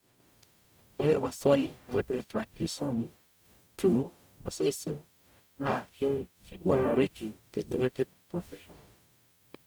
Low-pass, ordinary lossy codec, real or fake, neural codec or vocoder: none; none; fake; codec, 44.1 kHz, 0.9 kbps, DAC